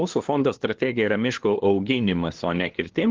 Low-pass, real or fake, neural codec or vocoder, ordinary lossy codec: 7.2 kHz; fake; codec, 16 kHz, 2 kbps, FunCodec, trained on LibriTTS, 25 frames a second; Opus, 16 kbps